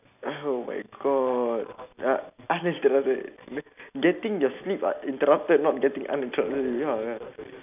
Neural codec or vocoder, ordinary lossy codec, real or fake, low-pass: none; none; real; 3.6 kHz